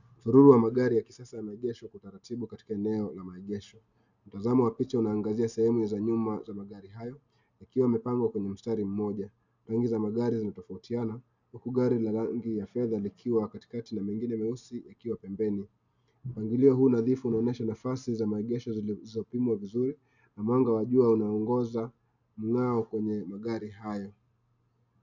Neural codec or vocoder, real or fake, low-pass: none; real; 7.2 kHz